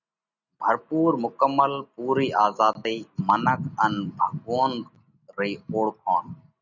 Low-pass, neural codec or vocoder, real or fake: 7.2 kHz; none; real